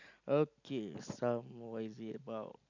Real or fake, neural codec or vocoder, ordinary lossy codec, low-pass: real; none; none; 7.2 kHz